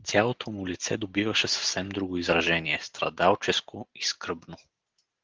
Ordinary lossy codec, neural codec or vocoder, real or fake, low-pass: Opus, 16 kbps; none; real; 7.2 kHz